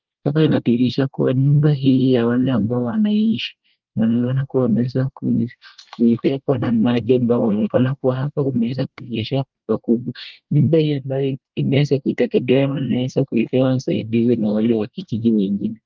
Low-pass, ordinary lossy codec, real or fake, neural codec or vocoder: 7.2 kHz; Opus, 24 kbps; fake; codec, 24 kHz, 1 kbps, SNAC